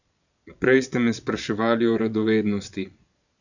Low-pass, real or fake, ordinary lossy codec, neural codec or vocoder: 7.2 kHz; fake; none; vocoder, 22.05 kHz, 80 mel bands, Vocos